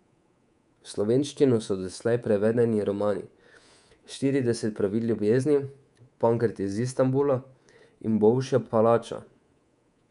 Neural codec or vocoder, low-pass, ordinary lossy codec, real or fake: codec, 24 kHz, 3.1 kbps, DualCodec; 10.8 kHz; none; fake